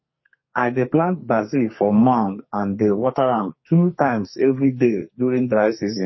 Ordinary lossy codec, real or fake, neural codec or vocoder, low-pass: MP3, 24 kbps; fake; codec, 44.1 kHz, 2.6 kbps, SNAC; 7.2 kHz